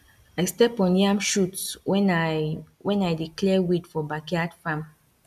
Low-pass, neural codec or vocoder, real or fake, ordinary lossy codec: 14.4 kHz; none; real; none